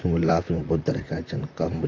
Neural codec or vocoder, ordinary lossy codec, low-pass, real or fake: vocoder, 44.1 kHz, 128 mel bands, Pupu-Vocoder; AAC, 48 kbps; 7.2 kHz; fake